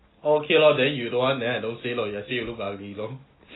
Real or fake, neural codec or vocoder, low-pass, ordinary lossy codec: real; none; 7.2 kHz; AAC, 16 kbps